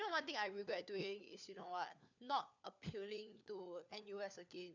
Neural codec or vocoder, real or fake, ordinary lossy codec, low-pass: codec, 16 kHz, 4 kbps, FunCodec, trained on LibriTTS, 50 frames a second; fake; none; 7.2 kHz